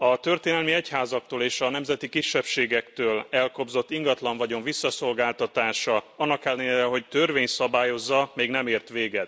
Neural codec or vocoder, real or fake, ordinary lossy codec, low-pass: none; real; none; none